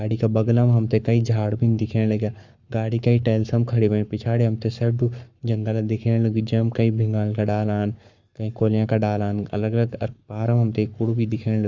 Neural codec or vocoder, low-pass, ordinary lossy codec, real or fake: codec, 44.1 kHz, 7.8 kbps, Pupu-Codec; 7.2 kHz; Opus, 64 kbps; fake